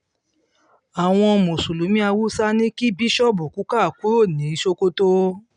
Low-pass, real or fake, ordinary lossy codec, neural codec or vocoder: 9.9 kHz; real; none; none